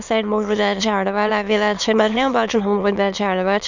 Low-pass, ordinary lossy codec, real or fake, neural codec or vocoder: 7.2 kHz; Opus, 64 kbps; fake; autoencoder, 22.05 kHz, a latent of 192 numbers a frame, VITS, trained on many speakers